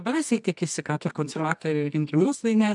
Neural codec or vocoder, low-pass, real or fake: codec, 24 kHz, 0.9 kbps, WavTokenizer, medium music audio release; 10.8 kHz; fake